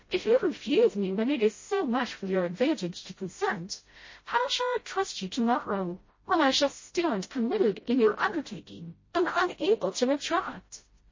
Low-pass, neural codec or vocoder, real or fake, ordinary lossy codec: 7.2 kHz; codec, 16 kHz, 0.5 kbps, FreqCodec, smaller model; fake; MP3, 32 kbps